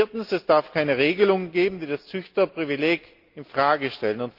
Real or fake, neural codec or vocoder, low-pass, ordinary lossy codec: real; none; 5.4 kHz; Opus, 24 kbps